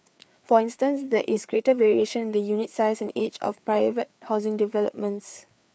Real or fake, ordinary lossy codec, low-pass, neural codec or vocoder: fake; none; none; codec, 16 kHz, 4 kbps, FreqCodec, larger model